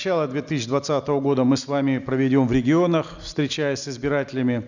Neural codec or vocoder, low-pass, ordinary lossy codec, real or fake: none; 7.2 kHz; none; real